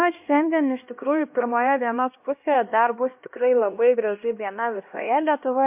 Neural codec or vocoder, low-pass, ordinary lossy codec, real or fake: codec, 16 kHz, 1 kbps, X-Codec, HuBERT features, trained on LibriSpeech; 3.6 kHz; MP3, 32 kbps; fake